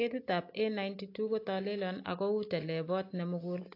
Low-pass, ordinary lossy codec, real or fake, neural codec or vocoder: 5.4 kHz; AAC, 32 kbps; real; none